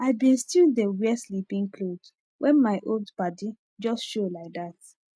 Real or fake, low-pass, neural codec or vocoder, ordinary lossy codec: real; none; none; none